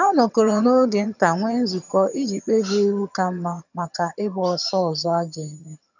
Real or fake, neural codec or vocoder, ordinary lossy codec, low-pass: fake; vocoder, 22.05 kHz, 80 mel bands, HiFi-GAN; none; 7.2 kHz